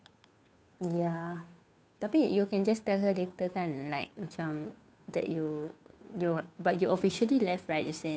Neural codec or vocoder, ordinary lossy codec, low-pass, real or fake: codec, 16 kHz, 2 kbps, FunCodec, trained on Chinese and English, 25 frames a second; none; none; fake